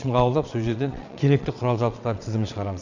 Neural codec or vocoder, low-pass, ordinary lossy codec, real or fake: vocoder, 22.05 kHz, 80 mel bands, WaveNeXt; 7.2 kHz; none; fake